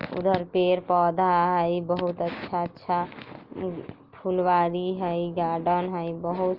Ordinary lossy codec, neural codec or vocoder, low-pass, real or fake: Opus, 24 kbps; none; 5.4 kHz; real